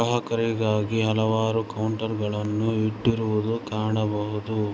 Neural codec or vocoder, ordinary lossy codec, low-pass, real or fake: none; none; none; real